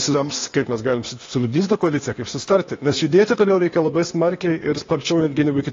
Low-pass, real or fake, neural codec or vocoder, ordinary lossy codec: 7.2 kHz; fake; codec, 16 kHz, 0.8 kbps, ZipCodec; AAC, 32 kbps